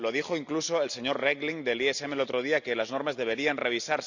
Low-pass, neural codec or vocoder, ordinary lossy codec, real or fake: 7.2 kHz; none; none; real